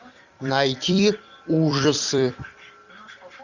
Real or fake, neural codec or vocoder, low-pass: fake; vocoder, 44.1 kHz, 128 mel bands every 256 samples, BigVGAN v2; 7.2 kHz